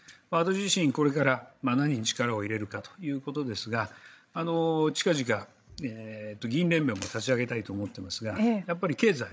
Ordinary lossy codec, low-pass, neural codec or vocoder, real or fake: none; none; codec, 16 kHz, 16 kbps, FreqCodec, larger model; fake